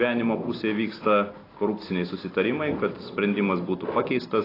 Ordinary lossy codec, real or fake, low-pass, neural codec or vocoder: AAC, 24 kbps; real; 5.4 kHz; none